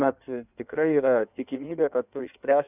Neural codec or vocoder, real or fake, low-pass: codec, 16 kHz in and 24 kHz out, 1.1 kbps, FireRedTTS-2 codec; fake; 3.6 kHz